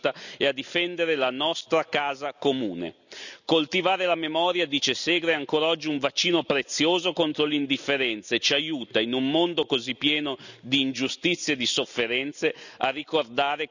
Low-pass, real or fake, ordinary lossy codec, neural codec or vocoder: 7.2 kHz; real; none; none